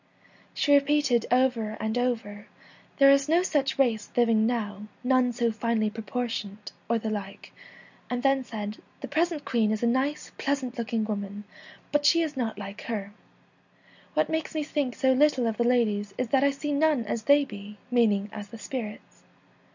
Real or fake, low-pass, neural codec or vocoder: real; 7.2 kHz; none